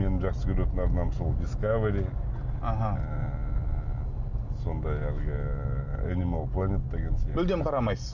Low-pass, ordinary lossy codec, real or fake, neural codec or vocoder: 7.2 kHz; none; real; none